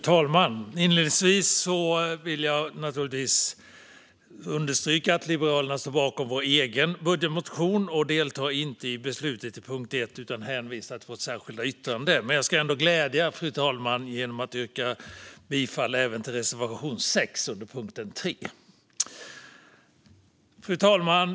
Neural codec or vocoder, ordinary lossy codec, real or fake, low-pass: none; none; real; none